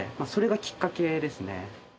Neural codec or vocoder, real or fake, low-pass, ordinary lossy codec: none; real; none; none